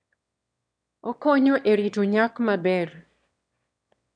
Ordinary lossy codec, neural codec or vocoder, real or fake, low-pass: none; autoencoder, 22.05 kHz, a latent of 192 numbers a frame, VITS, trained on one speaker; fake; 9.9 kHz